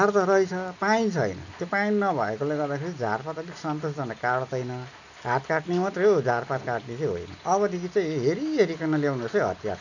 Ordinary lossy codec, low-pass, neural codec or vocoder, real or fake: none; 7.2 kHz; none; real